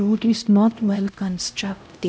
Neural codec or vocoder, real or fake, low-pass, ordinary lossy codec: codec, 16 kHz, 0.5 kbps, X-Codec, HuBERT features, trained on LibriSpeech; fake; none; none